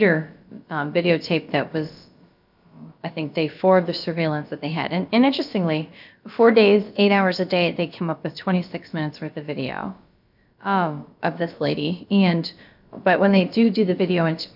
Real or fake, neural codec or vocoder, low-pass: fake; codec, 16 kHz, about 1 kbps, DyCAST, with the encoder's durations; 5.4 kHz